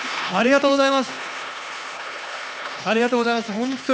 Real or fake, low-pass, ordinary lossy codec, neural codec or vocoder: fake; none; none; codec, 16 kHz, 2 kbps, X-Codec, HuBERT features, trained on LibriSpeech